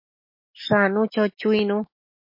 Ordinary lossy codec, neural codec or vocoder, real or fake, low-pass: MP3, 32 kbps; none; real; 5.4 kHz